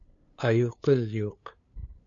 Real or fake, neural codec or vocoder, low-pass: fake; codec, 16 kHz, 2 kbps, FunCodec, trained on LibriTTS, 25 frames a second; 7.2 kHz